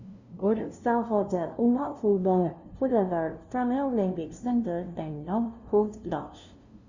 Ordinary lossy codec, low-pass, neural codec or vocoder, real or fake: Opus, 64 kbps; 7.2 kHz; codec, 16 kHz, 0.5 kbps, FunCodec, trained on LibriTTS, 25 frames a second; fake